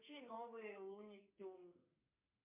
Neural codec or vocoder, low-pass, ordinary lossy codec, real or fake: codec, 44.1 kHz, 2.6 kbps, SNAC; 3.6 kHz; MP3, 32 kbps; fake